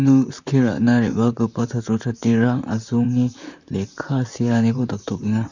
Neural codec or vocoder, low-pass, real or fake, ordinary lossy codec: vocoder, 44.1 kHz, 128 mel bands, Pupu-Vocoder; 7.2 kHz; fake; none